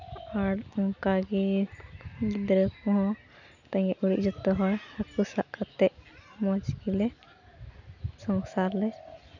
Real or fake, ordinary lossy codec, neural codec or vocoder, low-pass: real; none; none; 7.2 kHz